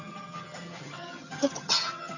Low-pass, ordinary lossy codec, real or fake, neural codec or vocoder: 7.2 kHz; none; fake; vocoder, 22.05 kHz, 80 mel bands, HiFi-GAN